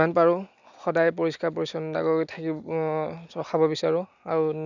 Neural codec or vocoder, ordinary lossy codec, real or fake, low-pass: none; none; real; 7.2 kHz